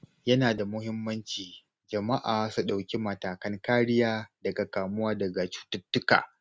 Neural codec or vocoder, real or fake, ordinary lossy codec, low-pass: none; real; none; none